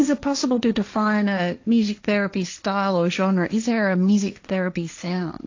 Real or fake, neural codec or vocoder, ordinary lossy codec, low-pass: fake; codec, 16 kHz, 1.1 kbps, Voila-Tokenizer; AAC, 48 kbps; 7.2 kHz